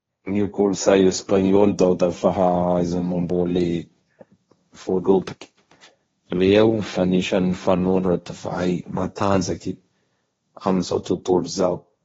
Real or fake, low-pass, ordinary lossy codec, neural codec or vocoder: fake; 7.2 kHz; AAC, 24 kbps; codec, 16 kHz, 1.1 kbps, Voila-Tokenizer